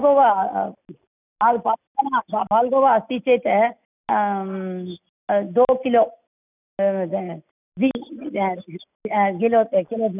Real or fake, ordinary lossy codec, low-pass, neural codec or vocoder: real; none; 3.6 kHz; none